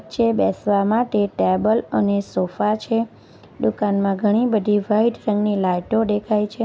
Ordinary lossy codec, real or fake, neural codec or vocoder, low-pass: none; real; none; none